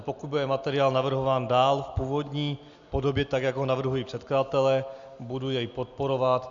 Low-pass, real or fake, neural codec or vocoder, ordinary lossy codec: 7.2 kHz; real; none; Opus, 64 kbps